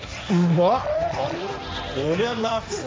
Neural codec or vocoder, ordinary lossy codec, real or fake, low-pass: codec, 16 kHz, 1.1 kbps, Voila-Tokenizer; none; fake; none